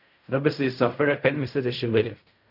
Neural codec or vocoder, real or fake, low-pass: codec, 16 kHz in and 24 kHz out, 0.4 kbps, LongCat-Audio-Codec, fine tuned four codebook decoder; fake; 5.4 kHz